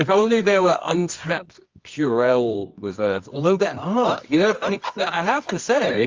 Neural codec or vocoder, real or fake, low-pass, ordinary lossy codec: codec, 24 kHz, 0.9 kbps, WavTokenizer, medium music audio release; fake; 7.2 kHz; Opus, 32 kbps